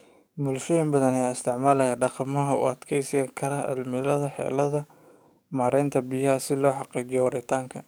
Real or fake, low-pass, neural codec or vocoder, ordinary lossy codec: fake; none; codec, 44.1 kHz, 7.8 kbps, DAC; none